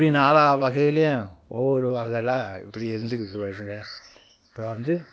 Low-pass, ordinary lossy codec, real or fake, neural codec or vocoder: none; none; fake; codec, 16 kHz, 0.8 kbps, ZipCodec